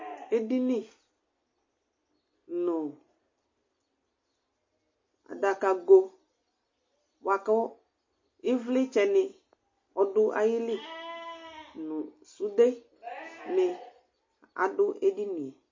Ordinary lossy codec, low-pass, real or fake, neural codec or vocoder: MP3, 32 kbps; 7.2 kHz; real; none